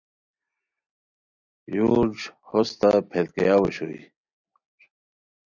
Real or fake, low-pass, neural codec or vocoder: real; 7.2 kHz; none